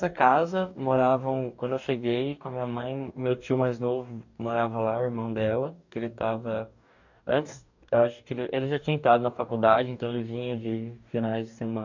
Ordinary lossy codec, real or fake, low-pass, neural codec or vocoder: none; fake; 7.2 kHz; codec, 44.1 kHz, 2.6 kbps, DAC